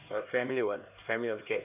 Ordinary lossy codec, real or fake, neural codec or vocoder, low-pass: none; fake; codec, 16 kHz, 2 kbps, X-Codec, HuBERT features, trained on LibriSpeech; 3.6 kHz